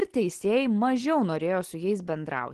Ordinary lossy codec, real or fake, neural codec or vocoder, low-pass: Opus, 16 kbps; real; none; 10.8 kHz